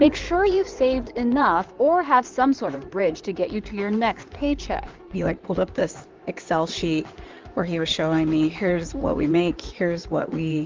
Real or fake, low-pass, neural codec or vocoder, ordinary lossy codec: fake; 7.2 kHz; codec, 16 kHz in and 24 kHz out, 2.2 kbps, FireRedTTS-2 codec; Opus, 32 kbps